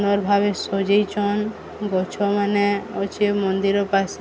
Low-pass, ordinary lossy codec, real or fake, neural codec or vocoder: none; none; real; none